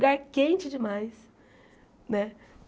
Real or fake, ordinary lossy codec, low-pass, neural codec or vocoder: real; none; none; none